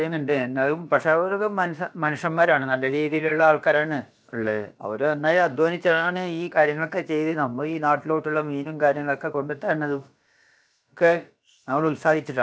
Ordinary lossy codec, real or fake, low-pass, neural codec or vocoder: none; fake; none; codec, 16 kHz, about 1 kbps, DyCAST, with the encoder's durations